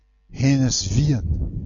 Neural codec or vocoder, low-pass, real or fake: none; 7.2 kHz; real